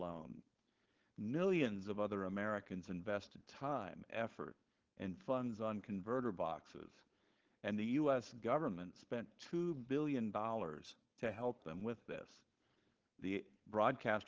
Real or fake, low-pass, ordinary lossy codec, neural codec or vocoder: fake; 7.2 kHz; Opus, 16 kbps; codec, 16 kHz, 4.8 kbps, FACodec